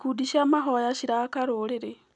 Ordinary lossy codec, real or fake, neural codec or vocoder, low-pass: none; real; none; 10.8 kHz